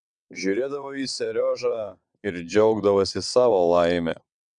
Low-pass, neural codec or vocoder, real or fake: 9.9 kHz; vocoder, 22.05 kHz, 80 mel bands, WaveNeXt; fake